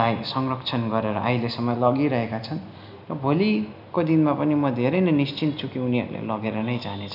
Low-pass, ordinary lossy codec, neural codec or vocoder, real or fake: 5.4 kHz; none; none; real